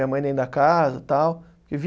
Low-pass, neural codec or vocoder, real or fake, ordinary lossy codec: none; none; real; none